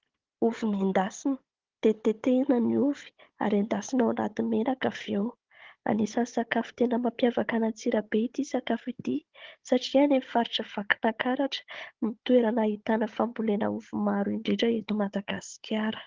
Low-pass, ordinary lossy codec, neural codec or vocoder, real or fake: 7.2 kHz; Opus, 16 kbps; codec, 16 kHz, 16 kbps, FunCodec, trained on Chinese and English, 50 frames a second; fake